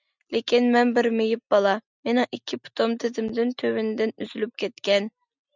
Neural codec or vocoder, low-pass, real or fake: none; 7.2 kHz; real